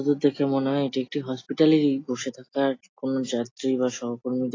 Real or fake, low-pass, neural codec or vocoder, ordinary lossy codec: real; 7.2 kHz; none; AAC, 32 kbps